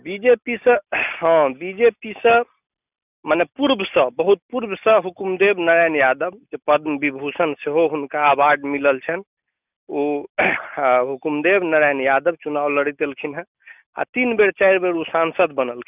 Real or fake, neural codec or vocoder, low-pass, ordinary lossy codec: real; none; 3.6 kHz; none